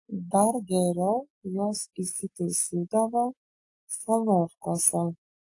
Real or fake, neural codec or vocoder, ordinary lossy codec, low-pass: real; none; AAC, 48 kbps; 10.8 kHz